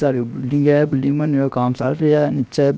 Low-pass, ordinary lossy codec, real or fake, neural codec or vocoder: none; none; fake; codec, 16 kHz, 0.7 kbps, FocalCodec